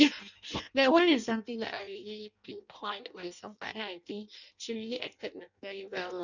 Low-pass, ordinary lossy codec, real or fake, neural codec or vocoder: 7.2 kHz; none; fake; codec, 16 kHz in and 24 kHz out, 0.6 kbps, FireRedTTS-2 codec